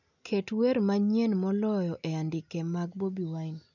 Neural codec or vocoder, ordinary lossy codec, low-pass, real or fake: none; none; 7.2 kHz; real